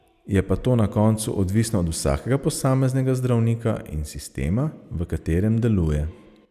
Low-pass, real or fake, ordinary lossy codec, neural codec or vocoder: 14.4 kHz; real; none; none